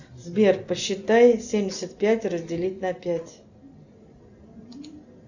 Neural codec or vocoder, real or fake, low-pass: none; real; 7.2 kHz